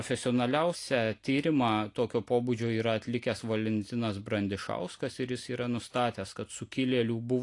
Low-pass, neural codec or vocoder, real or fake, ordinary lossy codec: 10.8 kHz; none; real; AAC, 48 kbps